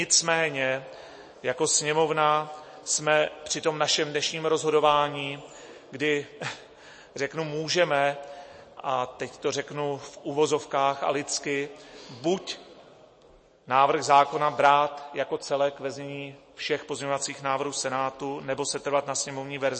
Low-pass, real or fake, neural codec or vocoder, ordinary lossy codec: 9.9 kHz; real; none; MP3, 32 kbps